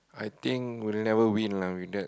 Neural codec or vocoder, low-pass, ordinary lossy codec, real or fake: none; none; none; real